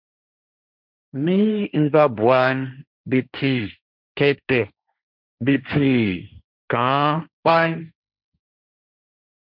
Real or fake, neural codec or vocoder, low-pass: fake; codec, 16 kHz, 1.1 kbps, Voila-Tokenizer; 5.4 kHz